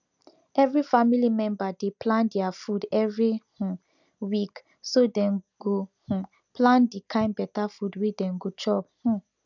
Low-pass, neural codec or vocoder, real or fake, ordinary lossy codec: 7.2 kHz; none; real; none